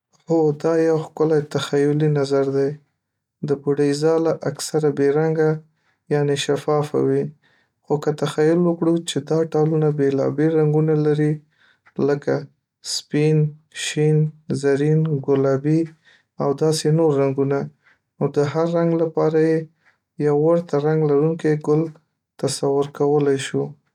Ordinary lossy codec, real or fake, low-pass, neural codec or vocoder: none; real; 19.8 kHz; none